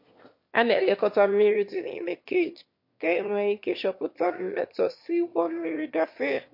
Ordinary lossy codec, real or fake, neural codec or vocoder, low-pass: MP3, 32 kbps; fake; autoencoder, 22.05 kHz, a latent of 192 numbers a frame, VITS, trained on one speaker; 5.4 kHz